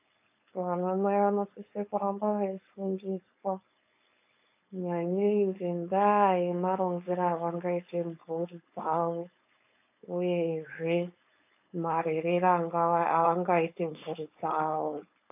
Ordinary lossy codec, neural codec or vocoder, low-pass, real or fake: AAC, 32 kbps; codec, 16 kHz, 4.8 kbps, FACodec; 3.6 kHz; fake